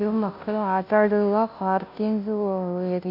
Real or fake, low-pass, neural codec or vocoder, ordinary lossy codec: fake; 5.4 kHz; codec, 16 kHz, 0.5 kbps, FunCodec, trained on Chinese and English, 25 frames a second; none